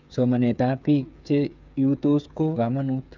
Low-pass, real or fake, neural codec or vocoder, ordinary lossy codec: 7.2 kHz; fake; codec, 16 kHz, 8 kbps, FreqCodec, smaller model; none